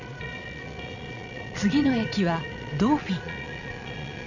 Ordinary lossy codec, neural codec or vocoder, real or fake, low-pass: none; vocoder, 22.05 kHz, 80 mel bands, Vocos; fake; 7.2 kHz